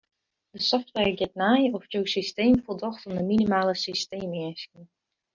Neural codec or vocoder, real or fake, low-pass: none; real; 7.2 kHz